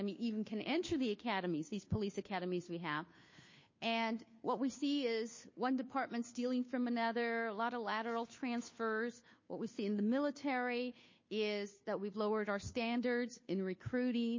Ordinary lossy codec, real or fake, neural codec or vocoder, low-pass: MP3, 32 kbps; fake; codec, 16 kHz, 2 kbps, FunCodec, trained on Chinese and English, 25 frames a second; 7.2 kHz